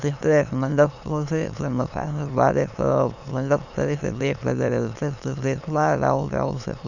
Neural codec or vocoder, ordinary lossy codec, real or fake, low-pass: autoencoder, 22.05 kHz, a latent of 192 numbers a frame, VITS, trained on many speakers; none; fake; 7.2 kHz